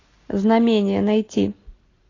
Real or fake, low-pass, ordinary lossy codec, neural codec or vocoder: real; 7.2 kHz; AAC, 32 kbps; none